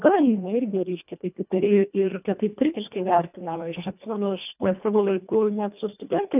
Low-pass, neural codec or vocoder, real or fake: 3.6 kHz; codec, 24 kHz, 1.5 kbps, HILCodec; fake